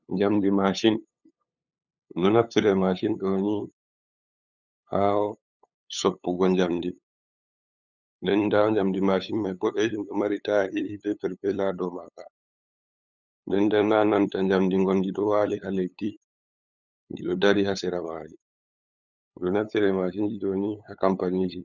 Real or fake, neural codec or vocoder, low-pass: fake; codec, 16 kHz, 8 kbps, FunCodec, trained on LibriTTS, 25 frames a second; 7.2 kHz